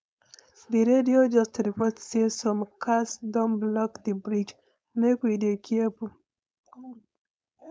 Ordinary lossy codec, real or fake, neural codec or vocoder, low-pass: none; fake; codec, 16 kHz, 4.8 kbps, FACodec; none